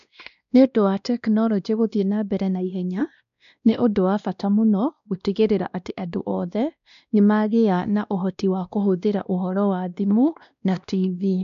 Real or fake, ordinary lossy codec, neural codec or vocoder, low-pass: fake; none; codec, 16 kHz, 1 kbps, X-Codec, WavLM features, trained on Multilingual LibriSpeech; 7.2 kHz